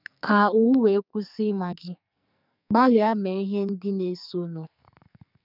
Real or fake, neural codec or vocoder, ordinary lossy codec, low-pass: fake; codec, 44.1 kHz, 2.6 kbps, SNAC; none; 5.4 kHz